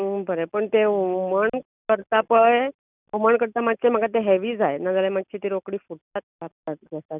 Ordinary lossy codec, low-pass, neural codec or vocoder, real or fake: none; 3.6 kHz; none; real